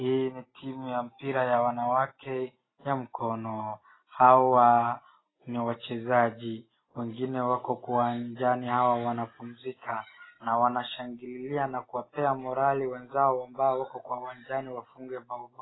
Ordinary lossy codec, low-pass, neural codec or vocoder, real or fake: AAC, 16 kbps; 7.2 kHz; none; real